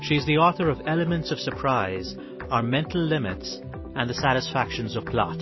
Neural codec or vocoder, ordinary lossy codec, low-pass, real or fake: none; MP3, 24 kbps; 7.2 kHz; real